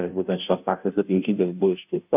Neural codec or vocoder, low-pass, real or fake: codec, 16 kHz, 0.5 kbps, FunCodec, trained on Chinese and English, 25 frames a second; 3.6 kHz; fake